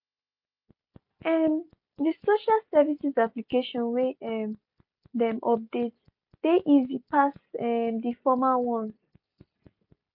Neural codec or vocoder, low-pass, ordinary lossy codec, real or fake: none; 5.4 kHz; MP3, 48 kbps; real